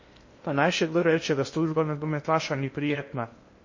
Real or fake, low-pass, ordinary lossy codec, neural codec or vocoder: fake; 7.2 kHz; MP3, 32 kbps; codec, 16 kHz in and 24 kHz out, 0.6 kbps, FocalCodec, streaming, 2048 codes